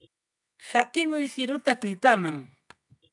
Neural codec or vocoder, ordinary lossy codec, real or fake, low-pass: codec, 24 kHz, 0.9 kbps, WavTokenizer, medium music audio release; MP3, 96 kbps; fake; 10.8 kHz